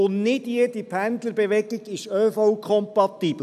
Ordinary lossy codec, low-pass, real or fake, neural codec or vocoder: none; 14.4 kHz; real; none